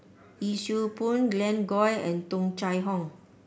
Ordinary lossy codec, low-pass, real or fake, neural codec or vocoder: none; none; real; none